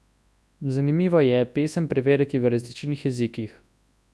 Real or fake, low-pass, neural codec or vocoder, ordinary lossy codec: fake; none; codec, 24 kHz, 0.9 kbps, WavTokenizer, large speech release; none